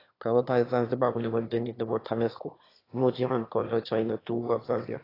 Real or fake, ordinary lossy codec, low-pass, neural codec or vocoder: fake; AAC, 24 kbps; 5.4 kHz; autoencoder, 22.05 kHz, a latent of 192 numbers a frame, VITS, trained on one speaker